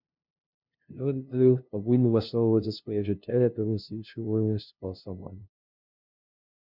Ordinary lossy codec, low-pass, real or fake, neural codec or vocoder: MP3, 48 kbps; 5.4 kHz; fake; codec, 16 kHz, 0.5 kbps, FunCodec, trained on LibriTTS, 25 frames a second